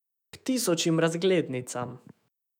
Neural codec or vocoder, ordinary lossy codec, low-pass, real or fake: autoencoder, 48 kHz, 128 numbers a frame, DAC-VAE, trained on Japanese speech; none; 19.8 kHz; fake